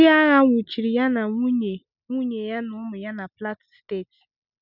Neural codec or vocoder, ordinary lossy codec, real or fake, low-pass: none; none; real; 5.4 kHz